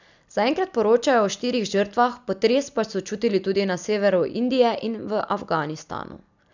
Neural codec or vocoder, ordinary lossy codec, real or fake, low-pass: none; none; real; 7.2 kHz